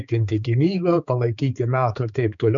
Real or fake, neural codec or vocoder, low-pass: fake; codec, 16 kHz, 4 kbps, X-Codec, HuBERT features, trained on balanced general audio; 7.2 kHz